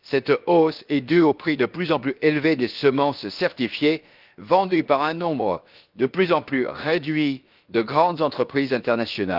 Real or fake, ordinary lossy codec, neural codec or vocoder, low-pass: fake; Opus, 24 kbps; codec, 16 kHz, about 1 kbps, DyCAST, with the encoder's durations; 5.4 kHz